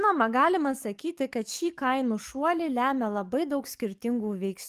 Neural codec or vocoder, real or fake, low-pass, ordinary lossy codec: codec, 44.1 kHz, 7.8 kbps, DAC; fake; 14.4 kHz; Opus, 32 kbps